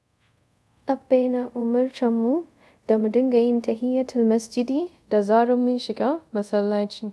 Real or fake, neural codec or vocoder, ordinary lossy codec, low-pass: fake; codec, 24 kHz, 0.5 kbps, DualCodec; none; none